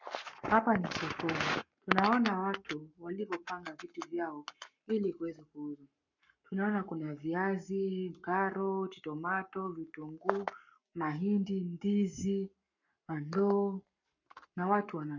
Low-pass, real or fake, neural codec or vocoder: 7.2 kHz; fake; codec, 44.1 kHz, 7.8 kbps, Pupu-Codec